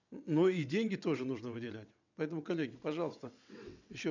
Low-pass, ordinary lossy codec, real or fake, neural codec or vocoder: 7.2 kHz; none; fake; vocoder, 22.05 kHz, 80 mel bands, WaveNeXt